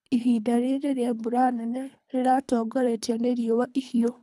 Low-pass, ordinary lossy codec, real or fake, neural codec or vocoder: none; none; fake; codec, 24 kHz, 3 kbps, HILCodec